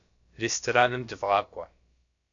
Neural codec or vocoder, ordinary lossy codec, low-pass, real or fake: codec, 16 kHz, about 1 kbps, DyCAST, with the encoder's durations; AAC, 64 kbps; 7.2 kHz; fake